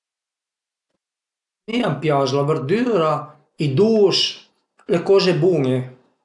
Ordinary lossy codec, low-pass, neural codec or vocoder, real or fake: none; 10.8 kHz; none; real